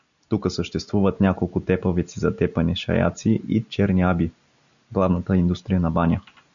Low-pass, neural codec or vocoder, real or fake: 7.2 kHz; none; real